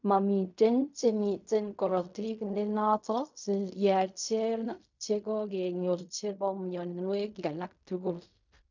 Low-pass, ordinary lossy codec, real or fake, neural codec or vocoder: 7.2 kHz; none; fake; codec, 16 kHz in and 24 kHz out, 0.4 kbps, LongCat-Audio-Codec, fine tuned four codebook decoder